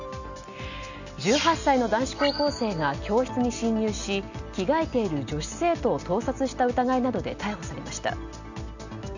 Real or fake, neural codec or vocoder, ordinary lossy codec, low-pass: real; none; none; 7.2 kHz